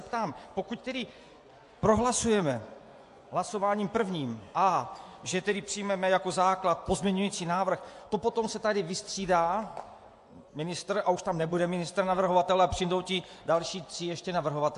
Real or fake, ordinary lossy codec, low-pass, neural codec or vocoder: fake; AAC, 64 kbps; 10.8 kHz; vocoder, 24 kHz, 100 mel bands, Vocos